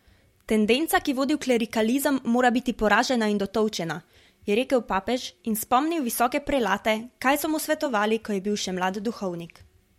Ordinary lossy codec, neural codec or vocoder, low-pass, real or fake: MP3, 64 kbps; vocoder, 44.1 kHz, 128 mel bands every 512 samples, BigVGAN v2; 19.8 kHz; fake